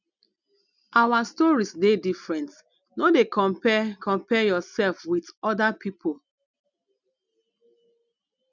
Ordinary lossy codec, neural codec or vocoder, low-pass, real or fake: none; none; 7.2 kHz; real